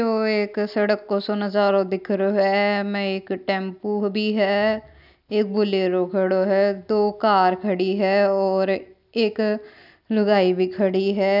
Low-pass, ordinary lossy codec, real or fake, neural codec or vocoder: 5.4 kHz; none; real; none